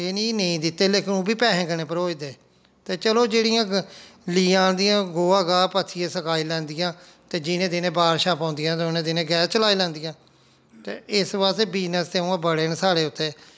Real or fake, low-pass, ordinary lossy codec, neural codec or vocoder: real; none; none; none